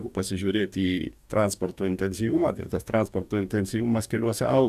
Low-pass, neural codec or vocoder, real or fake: 14.4 kHz; codec, 44.1 kHz, 2.6 kbps, DAC; fake